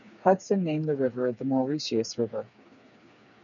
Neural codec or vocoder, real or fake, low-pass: codec, 16 kHz, 4 kbps, FreqCodec, smaller model; fake; 7.2 kHz